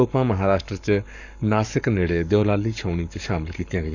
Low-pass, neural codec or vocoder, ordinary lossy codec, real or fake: 7.2 kHz; codec, 44.1 kHz, 7.8 kbps, Pupu-Codec; none; fake